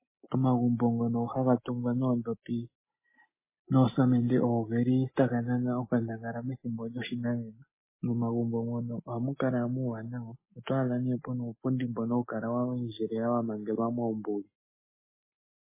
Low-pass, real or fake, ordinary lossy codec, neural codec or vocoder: 3.6 kHz; real; MP3, 16 kbps; none